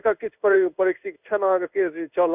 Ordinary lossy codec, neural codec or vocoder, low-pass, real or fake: none; codec, 16 kHz in and 24 kHz out, 1 kbps, XY-Tokenizer; 3.6 kHz; fake